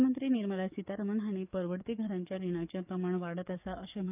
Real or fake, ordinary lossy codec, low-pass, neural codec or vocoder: fake; none; 3.6 kHz; codec, 44.1 kHz, 7.8 kbps, DAC